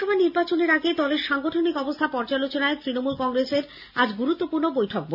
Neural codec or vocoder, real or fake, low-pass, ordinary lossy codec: none; real; 5.4 kHz; none